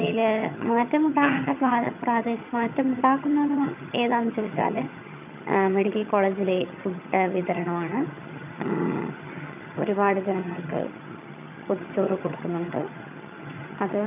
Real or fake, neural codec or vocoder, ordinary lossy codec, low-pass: fake; vocoder, 22.05 kHz, 80 mel bands, HiFi-GAN; none; 3.6 kHz